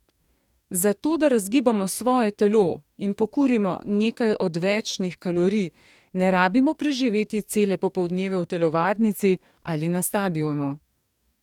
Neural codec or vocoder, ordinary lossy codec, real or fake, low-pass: codec, 44.1 kHz, 2.6 kbps, DAC; none; fake; 19.8 kHz